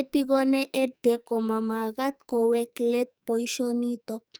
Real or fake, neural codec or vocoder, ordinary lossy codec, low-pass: fake; codec, 44.1 kHz, 2.6 kbps, SNAC; none; none